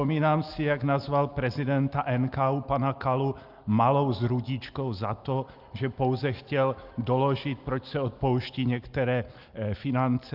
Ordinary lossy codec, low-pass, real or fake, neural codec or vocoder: Opus, 32 kbps; 5.4 kHz; real; none